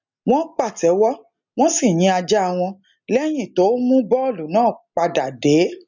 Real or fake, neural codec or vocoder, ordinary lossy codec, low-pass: real; none; none; 7.2 kHz